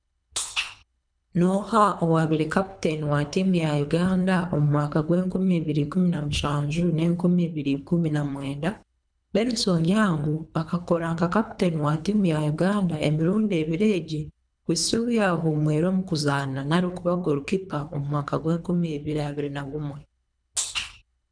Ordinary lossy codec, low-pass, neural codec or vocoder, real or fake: none; 9.9 kHz; codec, 24 kHz, 3 kbps, HILCodec; fake